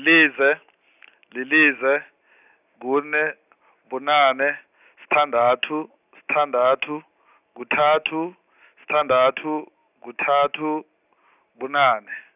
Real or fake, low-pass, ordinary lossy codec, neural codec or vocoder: real; 3.6 kHz; none; none